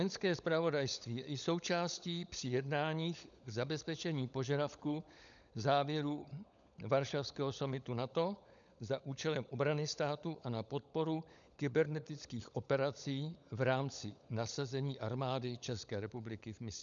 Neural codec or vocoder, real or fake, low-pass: codec, 16 kHz, 16 kbps, FunCodec, trained on LibriTTS, 50 frames a second; fake; 7.2 kHz